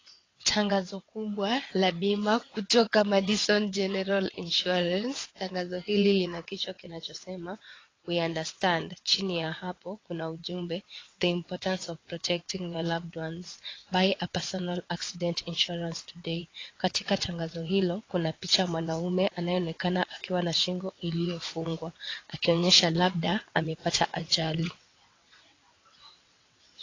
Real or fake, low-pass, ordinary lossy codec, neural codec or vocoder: fake; 7.2 kHz; AAC, 32 kbps; vocoder, 22.05 kHz, 80 mel bands, WaveNeXt